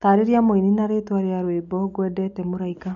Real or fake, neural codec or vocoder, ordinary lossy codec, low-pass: real; none; none; 7.2 kHz